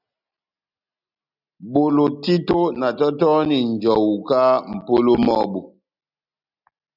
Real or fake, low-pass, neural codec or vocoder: real; 5.4 kHz; none